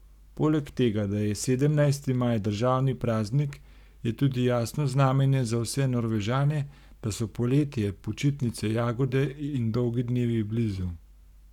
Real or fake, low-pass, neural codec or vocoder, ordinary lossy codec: fake; 19.8 kHz; codec, 44.1 kHz, 7.8 kbps, Pupu-Codec; none